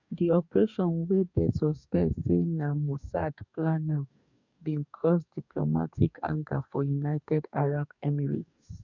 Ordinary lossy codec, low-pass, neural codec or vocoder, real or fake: none; 7.2 kHz; codec, 44.1 kHz, 2.6 kbps, DAC; fake